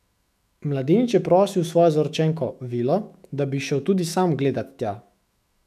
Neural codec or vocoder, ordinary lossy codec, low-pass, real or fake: autoencoder, 48 kHz, 128 numbers a frame, DAC-VAE, trained on Japanese speech; none; 14.4 kHz; fake